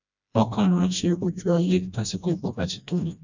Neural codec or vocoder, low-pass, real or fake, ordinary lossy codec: codec, 16 kHz, 1 kbps, FreqCodec, smaller model; 7.2 kHz; fake; none